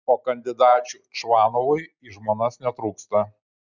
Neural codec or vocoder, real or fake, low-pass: none; real; 7.2 kHz